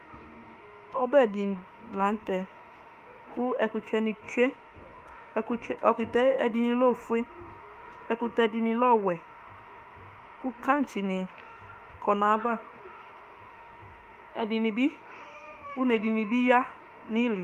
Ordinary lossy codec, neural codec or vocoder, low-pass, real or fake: Opus, 32 kbps; autoencoder, 48 kHz, 32 numbers a frame, DAC-VAE, trained on Japanese speech; 14.4 kHz; fake